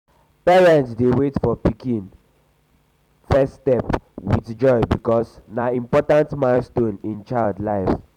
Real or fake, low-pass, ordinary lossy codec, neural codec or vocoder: fake; 19.8 kHz; none; vocoder, 44.1 kHz, 128 mel bands every 256 samples, BigVGAN v2